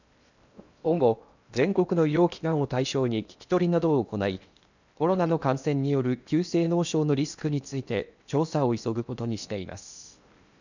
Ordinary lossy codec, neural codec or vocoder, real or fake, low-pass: none; codec, 16 kHz in and 24 kHz out, 0.8 kbps, FocalCodec, streaming, 65536 codes; fake; 7.2 kHz